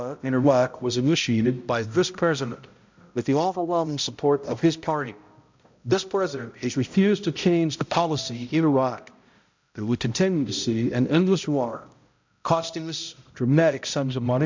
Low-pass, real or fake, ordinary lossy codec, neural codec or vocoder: 7.2 kHz; fake; MP3, 64 kbps; codec, 16 kHz, 0.5 kbps, X-Codec, HuBERT features, trained on balanced general audio